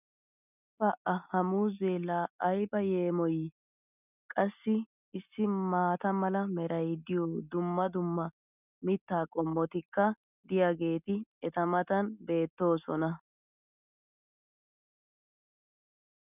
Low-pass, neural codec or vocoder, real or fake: 3.6 kHz; none; real